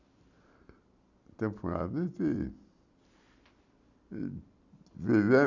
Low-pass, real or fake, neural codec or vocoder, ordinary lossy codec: 7.2 kHz; real; none; none